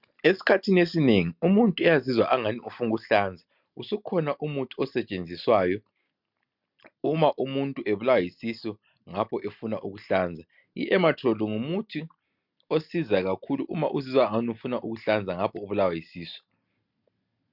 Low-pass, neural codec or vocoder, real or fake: 5.4 kHz; none; real